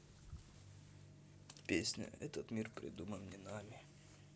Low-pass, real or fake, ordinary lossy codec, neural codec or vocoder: none; real; none; none